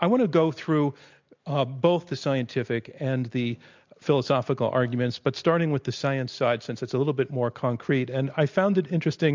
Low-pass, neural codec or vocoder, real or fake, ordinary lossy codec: 7.2 kHz; none; real; MP3, 64 kbps